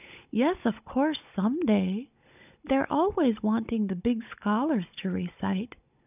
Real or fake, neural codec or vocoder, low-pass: fake; codec, 16 kHz, 16 kbps, FunCodec, trained on Chinese and English, 50 frames a second; 3.6 kHz